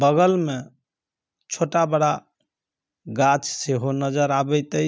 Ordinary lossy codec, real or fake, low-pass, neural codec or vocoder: none; real; none; none